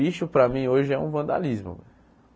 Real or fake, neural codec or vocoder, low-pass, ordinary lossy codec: real; none; none; none